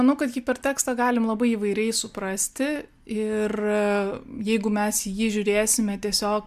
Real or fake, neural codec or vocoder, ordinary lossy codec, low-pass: real; none; MP3, 96 kbps; 14.4 kHz